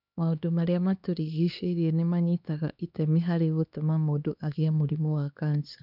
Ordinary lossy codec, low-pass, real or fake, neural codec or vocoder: none; 5.4 kHz; fake; codec, 16 kHz, 2 kbps, X-Codec, HuBERT features, trained on LibriSpeech